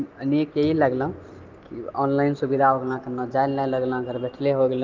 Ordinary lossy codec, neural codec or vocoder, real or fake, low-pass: Opus, 24 kbps; none; real; 7.2 kHz